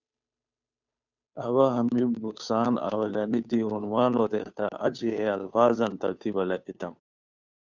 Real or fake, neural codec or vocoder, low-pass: fake; codec, 16 kHz, 2 kbps, FunCodec, trained on Chinese and English, 25 frames a second; 7.2 kHz